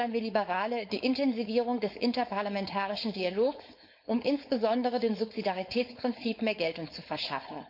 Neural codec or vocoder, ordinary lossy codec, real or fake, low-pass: codec, 16 kHz, 4.8 kbps, FACodec; MP3, 48 kbps; fake; 5.4 kHz